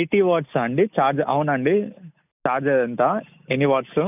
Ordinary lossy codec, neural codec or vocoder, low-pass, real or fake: none; none; 3.6 kHz; real